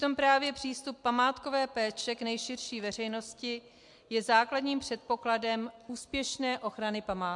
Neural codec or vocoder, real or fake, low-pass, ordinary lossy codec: none; real; 10.8 kHz; MP3, 64 kbps